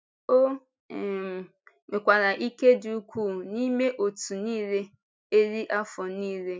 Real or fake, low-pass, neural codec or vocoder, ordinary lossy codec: real; none; none; none